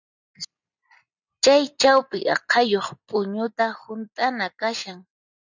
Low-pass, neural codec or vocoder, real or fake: 7.2 kHz; none; real